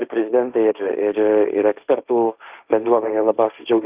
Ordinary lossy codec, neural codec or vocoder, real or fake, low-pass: Opus, 24 kbps; codec, 16 kHz, 1.1 kbps, Voila-Tokenizer; fake; 3.6 kHz